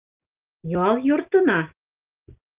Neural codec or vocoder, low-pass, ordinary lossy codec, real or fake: none; 3.6 kHz; Opus, 32 kbps; real